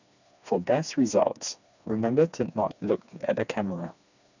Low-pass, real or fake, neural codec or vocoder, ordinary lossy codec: 7.2 kHz; fake; codec, 16 kHz, 2 kbps, FreqCodec, smaller model; none